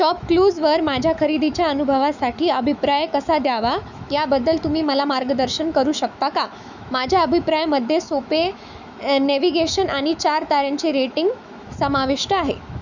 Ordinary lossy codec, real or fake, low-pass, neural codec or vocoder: none; fake; 7.2 kHz; autoencoder, 48 kHz, 128 numbers a frame, DAC-VAE, trained on Japanese speech